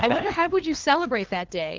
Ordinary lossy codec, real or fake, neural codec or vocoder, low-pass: Opus, 16 kbps; fake; codec, 16 kHz, 2 kbps, FunCodec, trained on LibriTTS, 25 frames a second; 7.2 kHz